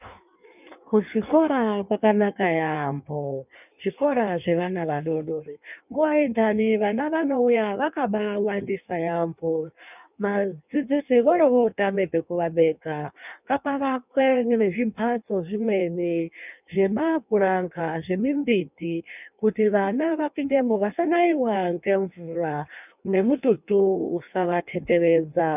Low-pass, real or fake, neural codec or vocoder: 3.6 kHz; fake; codec, 16 kHz in and 24 kHz out, 1.1 kbps, FireRedTTS-2 codec